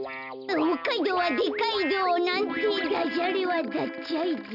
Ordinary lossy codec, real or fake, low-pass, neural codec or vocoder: none; real; 5.4 kHz; none